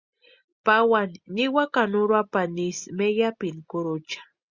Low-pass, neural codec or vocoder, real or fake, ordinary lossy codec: 7.2 kHz; none; real; Opus, 64 kbps